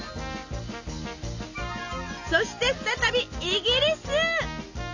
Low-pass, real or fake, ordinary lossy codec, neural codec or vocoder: 7.2 kHz; real; none; none